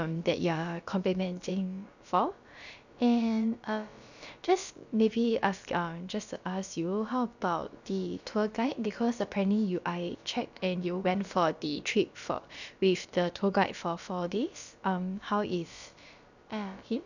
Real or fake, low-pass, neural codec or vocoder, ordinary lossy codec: fake; 7.2 kHz; codec, 16 kHz, about 1 kbps, DyCAST, with the encoder's durations; none